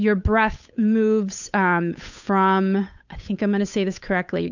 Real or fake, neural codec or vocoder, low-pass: fake; codec, 16 kHz, 8 kbps, FunCodec, trained on Chinese and English, 25 frames a second; 7.2 kHz